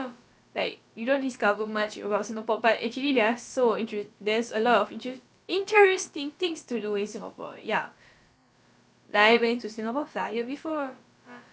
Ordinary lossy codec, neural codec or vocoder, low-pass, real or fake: none; codec, 16 kHz, about 1 kbps, DyCAST, with the encoder's durations; none; fake